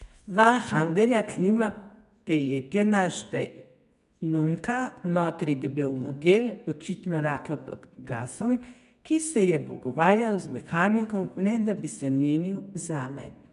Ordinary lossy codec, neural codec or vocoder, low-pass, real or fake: none; codec, 24 kHz, 0.9 kbps, WavTokenizer, medium music audio release; 10.8 kHz; fake